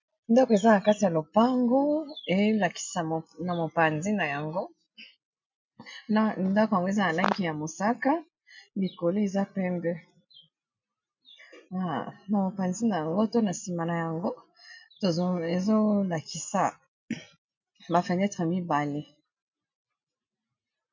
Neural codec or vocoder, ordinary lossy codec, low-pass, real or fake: none; MP3, 64 kbps; 7.2 kHz; real